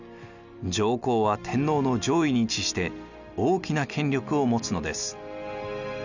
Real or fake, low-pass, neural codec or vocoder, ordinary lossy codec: real; 7.2 kHz; none; none